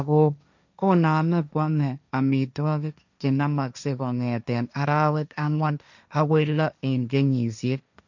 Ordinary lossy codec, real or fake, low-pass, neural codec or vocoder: none; fake; 7.2 kHz; codec, 16 kHz, 1.1 kbps, Voila-Tokenizer